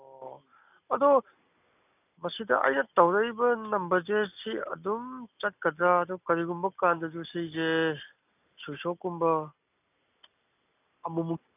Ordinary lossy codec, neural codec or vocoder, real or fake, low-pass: none; none; real; 3.6 kHz